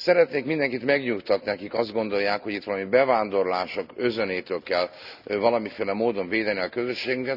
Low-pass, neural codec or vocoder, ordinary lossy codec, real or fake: 5.4 kHz; none; none; real